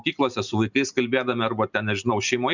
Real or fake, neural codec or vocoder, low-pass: real; none; 7.2 kHz